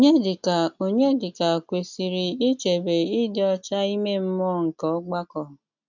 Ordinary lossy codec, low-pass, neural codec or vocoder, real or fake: none; 7.2 kHz; none; real